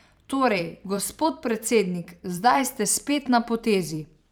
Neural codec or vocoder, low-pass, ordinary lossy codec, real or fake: vocoder, 44.1 kHz, 128 mel bands every 512 samples, BigVGAN v2; none; none; fake